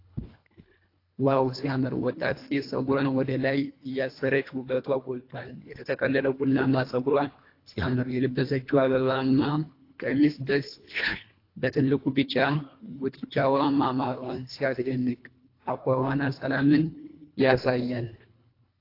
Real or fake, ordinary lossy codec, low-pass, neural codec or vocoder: fake; AAC, 32 kbps; 5.4 kHz; codec, 24 kHz, 1.5 kbps, HILCodec